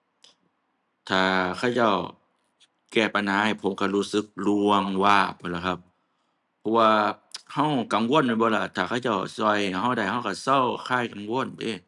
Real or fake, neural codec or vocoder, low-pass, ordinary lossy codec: real; none; 10.8 kHz; none